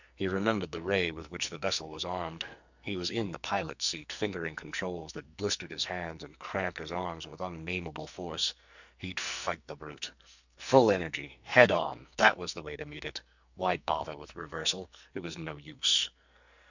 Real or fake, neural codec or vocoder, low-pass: fake; codec, 44.1 kHz, 2.6 kbps, SNAC; 7.2 kHz